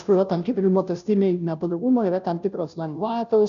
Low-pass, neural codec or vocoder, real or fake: 7.2 kHz; codec, 16 kHz, 0.5 kbps, FunCodec, trained on Chinese and English, 25 frames a second; fake